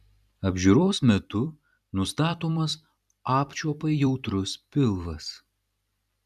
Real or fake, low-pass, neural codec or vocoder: fake; 14.4 kHz; vocoder, 44.1 kHz, 128 mel bands every 256 samples, BigVGAN v2